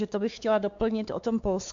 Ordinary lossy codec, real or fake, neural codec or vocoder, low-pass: Opus, 64 kbps; fake; codec, 16 kHz, 4 kbps, X-Codec, WavLM features, trained on Multilingual LibriSpeech; 7.2 kHz